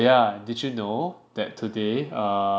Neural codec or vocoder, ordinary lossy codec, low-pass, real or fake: none; none; none; real